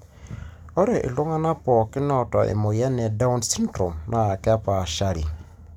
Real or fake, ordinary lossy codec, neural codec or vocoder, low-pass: real; none; none; 19.8 kHz